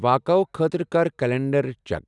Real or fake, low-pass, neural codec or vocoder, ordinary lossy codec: real; 10.8 kHz; none; none